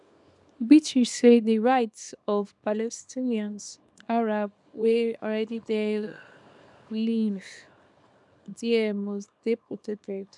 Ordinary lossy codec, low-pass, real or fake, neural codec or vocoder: none; 10.8 kHz; fake; codec, 24 kHz, 0.9 kbps, WavTokenizer, small release